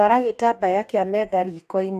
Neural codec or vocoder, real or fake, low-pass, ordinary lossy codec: codec, 44.1 kHz, 2.6 kbps, DAC; fake; 14.4 kHz; none